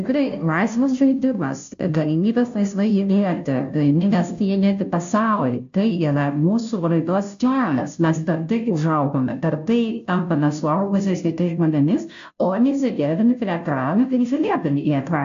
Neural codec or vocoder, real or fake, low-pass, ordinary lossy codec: codec, 16 kHz, 0.5 kbps, FunCodec, trained on Chinese and English, 25 frames a second; fake; 7.2 kHz; AAC, 64 kbps